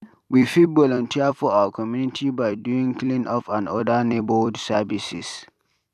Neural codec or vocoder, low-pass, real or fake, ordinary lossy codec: vocoder, 44.1 kHz, 128 mel bands every 256 samples, BigVGAN v2; 14.4 kHz; fake; none